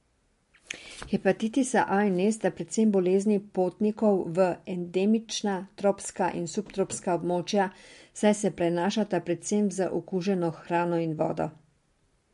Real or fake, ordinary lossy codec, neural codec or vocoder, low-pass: real; MP3, 48 kbps; none; 10.8 kHz